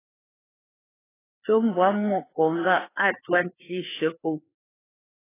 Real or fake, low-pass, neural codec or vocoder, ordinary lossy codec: fake; 3.6 kHz; codec, 16 kHz, 2 kbps, FreqCodec, larger model; AAC, 16 kbps